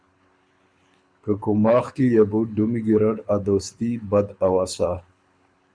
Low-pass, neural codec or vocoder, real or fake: 9.9 kHz; codec, 24 kHz, 6 kbps, HILCodec; fake